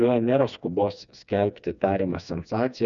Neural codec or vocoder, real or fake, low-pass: codec, 16 kHz, 2 kbps, FreqCodec, smaller model; fake; 7.2 kHz